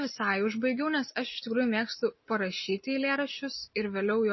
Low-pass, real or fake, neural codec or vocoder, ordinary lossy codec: 7.2 kHz; real; none; MP3, 24 kbps